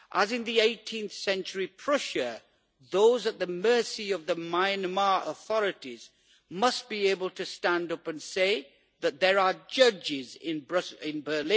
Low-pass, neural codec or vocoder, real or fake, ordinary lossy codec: none; none; real; none